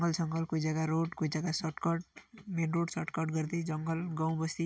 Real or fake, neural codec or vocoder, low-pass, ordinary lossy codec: real; none; none; none